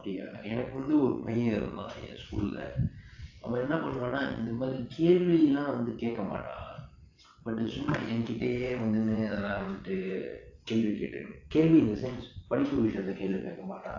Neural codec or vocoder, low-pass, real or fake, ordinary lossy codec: vocoder, 22.05 kHz, 80 mel bands, WaveNeXt; 7.2 kHz; fake; AAC, 48 kbps